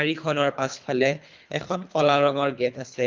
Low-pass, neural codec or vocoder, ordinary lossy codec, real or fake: 7.2 kHz; codec, 24 kHz, 3 kbps, HILCodec; Opus, 24 kbps; fake